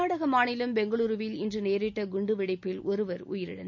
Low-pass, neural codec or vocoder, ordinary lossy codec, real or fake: 7.2 kHz; none; none; real